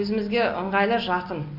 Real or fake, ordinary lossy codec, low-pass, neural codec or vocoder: real; Opus, 64 kbps; 5.4 kHz; none